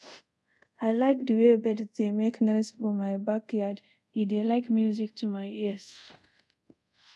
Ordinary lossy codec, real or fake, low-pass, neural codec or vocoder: none; fake; none; codec, 24 kHz, 0.5 kbps, DualCodec